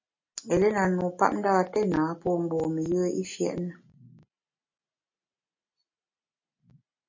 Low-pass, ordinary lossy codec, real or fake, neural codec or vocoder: 7.2 kHz; MP3, 32 kbps; real; none